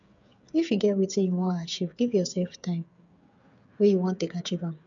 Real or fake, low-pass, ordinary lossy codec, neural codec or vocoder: fake; 7.2 kHz; none; codec, 16 kHz, 8 kbps, FreqCodec, smaller model